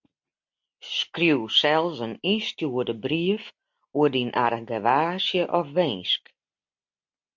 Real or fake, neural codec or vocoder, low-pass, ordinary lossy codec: real; none; 7.2 kHz; MP3, 64 kbps